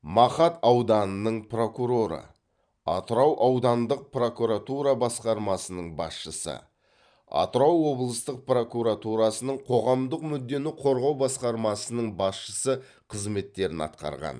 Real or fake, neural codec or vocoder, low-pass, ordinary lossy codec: fake; vocoder, 44.1 kHz, 128 mel bands every 512 samples, BigVGAN v2; 9.9 kHz; none